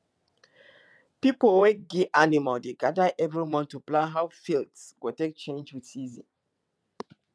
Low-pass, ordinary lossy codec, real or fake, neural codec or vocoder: none; none; fake; vocoder, 22.05 kHz, 80 mel bands, WaveNeXt